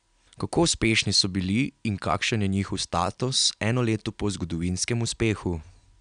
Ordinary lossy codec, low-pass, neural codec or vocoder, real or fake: none; 9.9 kHz; none; real